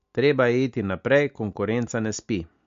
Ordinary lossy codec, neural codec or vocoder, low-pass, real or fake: MP3, 48 kbps; none; 7.2 kHz; real